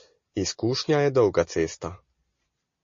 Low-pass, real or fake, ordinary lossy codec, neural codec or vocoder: 7.2 kHz; real; MP3, 32 kbps; none